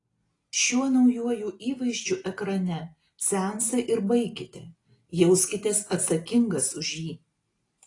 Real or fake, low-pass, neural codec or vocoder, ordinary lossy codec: real; 10.8 kHz; none; AAC, 32 kbps